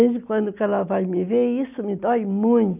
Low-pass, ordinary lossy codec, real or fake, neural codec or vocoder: 3.6 kHz; none; real; none